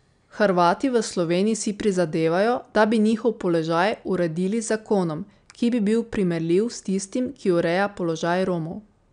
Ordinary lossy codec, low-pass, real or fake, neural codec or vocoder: MP3, 96 kbps; 9.9 kHz; real; none